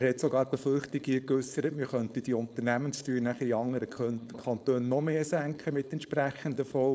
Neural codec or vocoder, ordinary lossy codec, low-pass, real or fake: codec, 16 kHz, 4.8 kbps, FACodec; none; none; fake